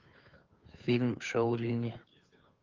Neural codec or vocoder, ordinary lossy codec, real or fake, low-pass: codec, 16 kHz, 2 kbps, FunCodec, trained on Chinese and English, 25 frames a second; Opus, 24 kbps; fake; 7.2 kHz